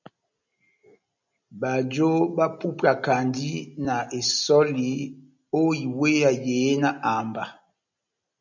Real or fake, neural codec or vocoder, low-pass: real; none; 7.2 kHz